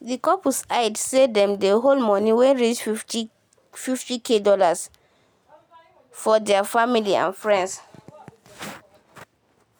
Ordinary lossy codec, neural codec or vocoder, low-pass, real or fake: none; none; none; real